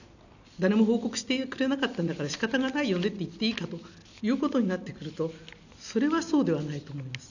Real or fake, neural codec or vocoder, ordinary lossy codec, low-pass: real; none; none; 7.2 kHz